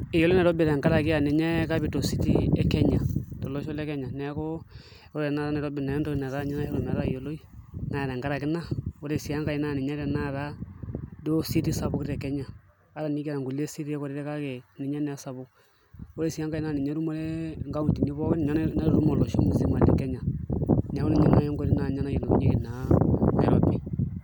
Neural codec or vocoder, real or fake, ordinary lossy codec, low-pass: none; real; none; none